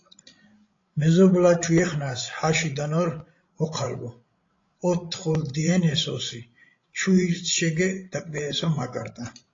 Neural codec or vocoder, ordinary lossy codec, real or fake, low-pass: codec, 16 kHz, 16 kbps, FreqCodec, larger model; AAC, 32 kbps; fake; 7.2 kHz